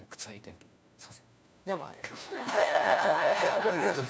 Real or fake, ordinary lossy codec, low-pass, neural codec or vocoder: fake; none; none; codec, 16 kHz, 1 kbps, FunCodec, trained on LibriTTS, 50 frames a second